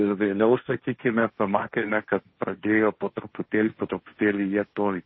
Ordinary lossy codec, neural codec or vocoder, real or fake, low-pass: MP3, 24 kbps; codec, 16 kHz, 1.1 kbps, Voila-Tokenizer; fake; 7.2 kHz